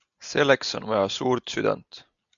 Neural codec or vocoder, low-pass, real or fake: none; 7.2 kHz; real